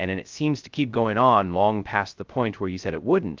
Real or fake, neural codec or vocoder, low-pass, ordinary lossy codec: fake; codec, 16 kHz, 0.2 kbps, FocalCodec; 7.2 kHz; Opus, 32 kbps